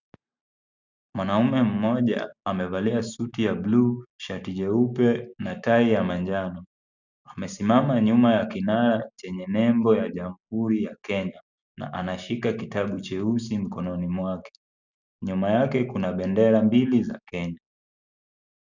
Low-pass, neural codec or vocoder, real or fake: 7.2 kHz; none; real